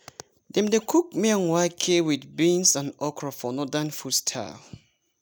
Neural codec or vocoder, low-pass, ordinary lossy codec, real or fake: none; none; none; real